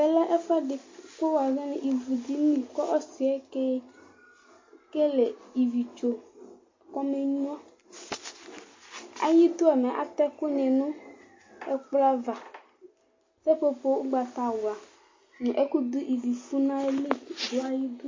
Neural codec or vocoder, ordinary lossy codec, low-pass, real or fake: none; MP3, 32 kbps; 7.2 kHz; real